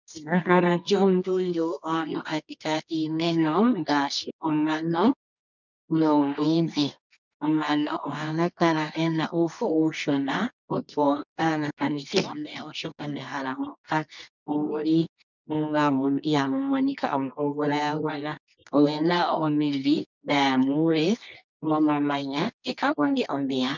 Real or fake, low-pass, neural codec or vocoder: fake; 7.2 kHz; codec, 24 kHz, 0.9 kbps, WavTokenizer, medium music audio release